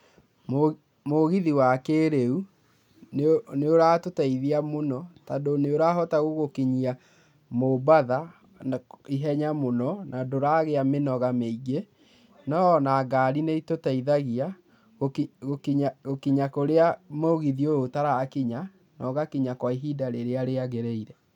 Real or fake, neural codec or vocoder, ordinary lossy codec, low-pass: real; none; none; 19.8 kHz